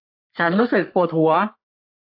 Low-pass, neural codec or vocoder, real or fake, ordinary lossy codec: 5.4 kHz; codec, 44.1 kHz, 3.4 kbps, Pupu-Codec; fake; AAC, 48 kbps